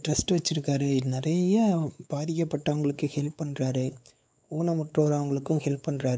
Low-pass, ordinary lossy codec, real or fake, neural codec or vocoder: none; none; fake; codec, 16 kHz, 4 kbps, X-Codec, WavLM features, trained on Multilingual LibriSpeech